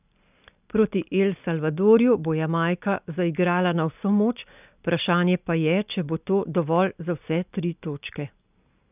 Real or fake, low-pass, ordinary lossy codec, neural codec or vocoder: real; 3.6 kHz; none; none